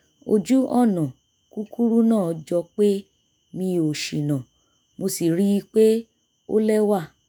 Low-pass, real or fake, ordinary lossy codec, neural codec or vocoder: none; fake; none; autoencoder, 48 kHz, 128 numbers a frame, DAC-VAE, trained on Japanese speech